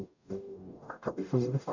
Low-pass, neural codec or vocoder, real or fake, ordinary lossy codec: 7.2 kHz; codec, 44.1 kHz, 0.9 kbps, DAC; fake; none